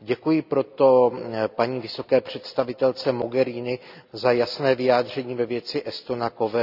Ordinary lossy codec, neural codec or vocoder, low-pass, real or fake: none; none; 5.4 kHz; real